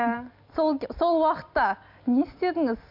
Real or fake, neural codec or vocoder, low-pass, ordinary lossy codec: real; none; 5.4 kHz; MP3, 48 kbps